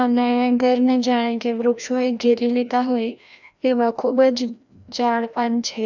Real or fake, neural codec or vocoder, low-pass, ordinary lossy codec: fake; codec, 16 kHz, 1 kbps, FreqCodec, larger model; 7.2 kHz; none